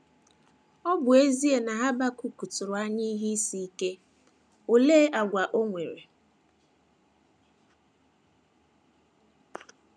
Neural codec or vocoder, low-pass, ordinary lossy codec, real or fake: none; 9.9 kHz; none; real